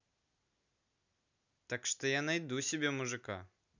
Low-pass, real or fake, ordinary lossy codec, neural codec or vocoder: 7.2 kHz; real; none; none